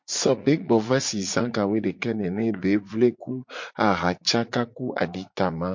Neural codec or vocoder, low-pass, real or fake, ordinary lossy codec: vocoder, 44.1 kHz, 80 mel bands, Vocos; 7.2 kHz; fake; MP3, 48 kbps